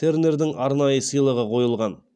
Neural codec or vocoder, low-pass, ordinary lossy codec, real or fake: none; none; none; real